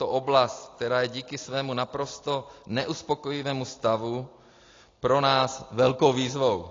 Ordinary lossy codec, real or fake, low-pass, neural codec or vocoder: AAC, 32 kbps; real; 7.2 kHz; none